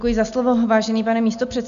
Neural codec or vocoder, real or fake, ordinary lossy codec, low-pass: none; real; AAC, 96 kbps; 7.2 kHz